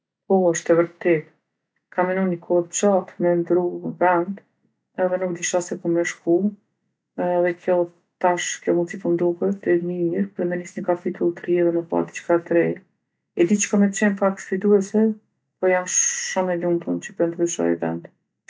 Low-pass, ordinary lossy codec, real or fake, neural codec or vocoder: none; none; real; none